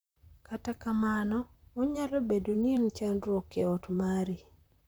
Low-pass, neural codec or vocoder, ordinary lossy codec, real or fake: none; vocoder, 44.1 kHz, 128 mel bands, Pupu-Vocoder; none; fake